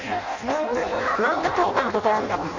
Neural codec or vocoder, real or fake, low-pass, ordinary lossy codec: codec, 16 kHz in and 24 kHz out, 0.6 kbps, FireRedTTS-2 codec; fake; 7.2 kHz; Opus, 64 kbps